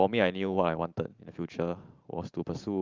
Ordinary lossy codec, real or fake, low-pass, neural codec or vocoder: Opus, 24 kbps; fake; 7.2 kHz; vocoder, 44.1 kHz, 128 mel bands every 512 samples, BigVGAN v2